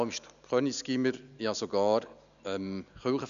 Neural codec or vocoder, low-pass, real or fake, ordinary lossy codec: none; 7.2 kHz; real; none